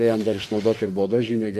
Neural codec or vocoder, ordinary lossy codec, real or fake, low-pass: autoencoder, 48 kHz, 32 numbers a frame, DAC-VAE, trained on Japanese speech; MP3, 64 kbps; fake; 14.4 kHz